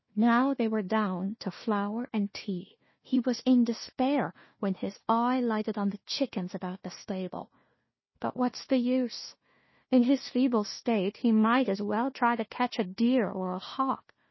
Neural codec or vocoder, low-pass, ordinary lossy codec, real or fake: codec, 16 kHz, 1 kbps, FunCodec, trained on Chinese and English, 50 frames a second; 7.2 kHz; MP3, 24 kbps; fake